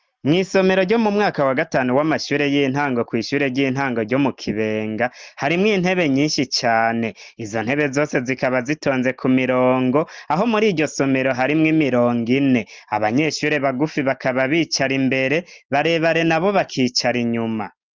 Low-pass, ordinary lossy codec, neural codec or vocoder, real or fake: 7.2 kHz; Opus, 32 kbps; none; real